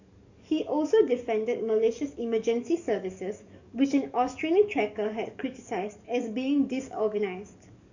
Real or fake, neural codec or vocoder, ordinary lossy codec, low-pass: fake; codec, 44.1 kHz, 7.8 kbps, DAC; none; 7.2 kHz